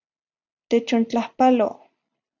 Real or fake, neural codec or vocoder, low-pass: real; none; 7.2 kHz